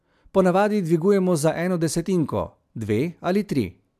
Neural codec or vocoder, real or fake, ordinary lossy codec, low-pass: none; real; MP3, 96 kbps; 14.4 kHz